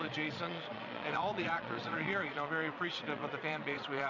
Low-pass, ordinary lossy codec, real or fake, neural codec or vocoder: 7.2 kHz; MP3, 64 kbps; fake; vocoder, 22.05 kHz, 80 mel bands, Vocos